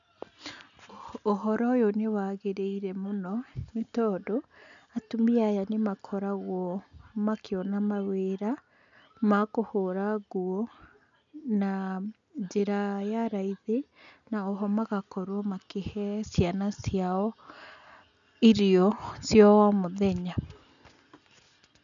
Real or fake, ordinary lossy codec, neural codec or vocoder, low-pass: real; none; none; 7.2 kHz